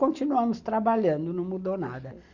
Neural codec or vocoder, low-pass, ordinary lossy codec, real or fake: none; 7.2 kHz; Opus, 64 kbps; real